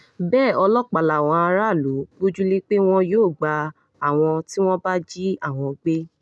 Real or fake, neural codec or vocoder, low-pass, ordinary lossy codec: real; none; none; none